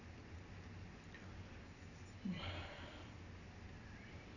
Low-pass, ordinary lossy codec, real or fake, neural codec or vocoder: 7.2 kHz; none; real; none